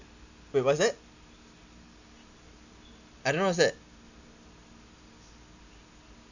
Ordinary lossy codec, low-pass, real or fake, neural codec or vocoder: none; 7.2 kHz; real; none